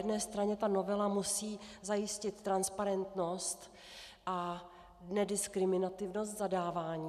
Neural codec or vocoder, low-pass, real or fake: none; 14.4 kHz; real